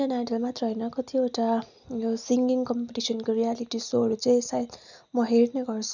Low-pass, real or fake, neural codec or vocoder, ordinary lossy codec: 7.2 kHz; real; none; none